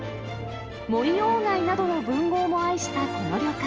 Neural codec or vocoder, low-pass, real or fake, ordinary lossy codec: none; 7.2 kHz; real; Opus, 24 kbps